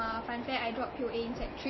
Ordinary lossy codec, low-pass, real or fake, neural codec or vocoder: MP3, 24 kbps; 7.2 kHz; real; none